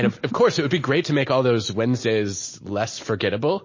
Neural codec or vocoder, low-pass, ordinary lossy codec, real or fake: none; 7.2 kHz; MP3, 32 kbps; real